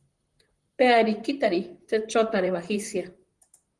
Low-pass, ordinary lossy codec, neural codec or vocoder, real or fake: 10.8 kHz; Opus, 24 kbps; vocoder, 44.1 kHz, 128 mel bands, Pupu-Vocoder; fake